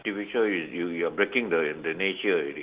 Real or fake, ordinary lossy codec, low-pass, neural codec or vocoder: real; Opus, 16 kbps; 3.6 kHz; none